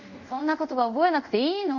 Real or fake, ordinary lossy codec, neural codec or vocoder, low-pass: fake; none; codec, 24 kHz, 0.5 kbps, DualCodec; 7.2 kHz